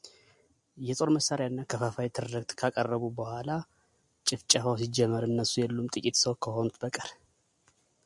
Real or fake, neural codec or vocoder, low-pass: real; none; 10.8 kHz